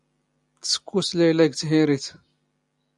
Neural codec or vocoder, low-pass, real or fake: none; 10.8 kHz; real